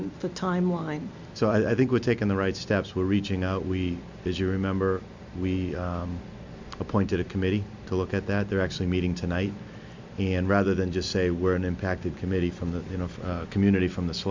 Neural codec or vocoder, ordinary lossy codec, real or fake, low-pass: none; MP3, 64 kbps; real; 7.2 kHz